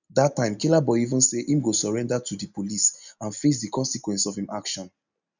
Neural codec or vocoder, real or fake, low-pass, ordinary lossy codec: none; real; 7.2 kHz; none